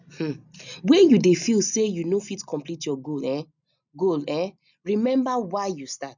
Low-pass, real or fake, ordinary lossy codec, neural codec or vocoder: 7.2 kHz; real; none; none